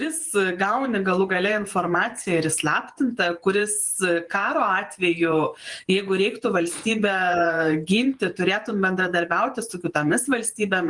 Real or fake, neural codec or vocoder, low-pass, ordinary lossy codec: fake; vocoder, 48 kHz, 128 mel bands, Vocos; 10.8 kHz; Opus, 24 kbps